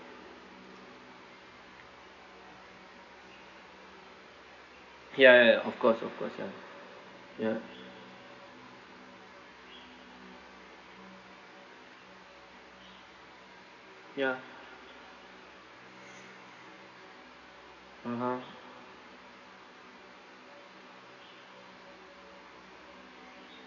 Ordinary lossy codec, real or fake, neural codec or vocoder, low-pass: none; real; none; 7.2 kHz